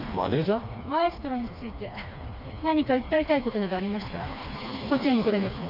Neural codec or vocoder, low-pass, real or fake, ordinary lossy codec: codec, 16 kHz, 2 kbps, FreqCodec, smaller model; 5.4 kHz; fake; AAC, 48 kbps